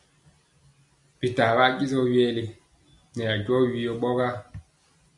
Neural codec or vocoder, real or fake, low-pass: none; real; 10.8 kHz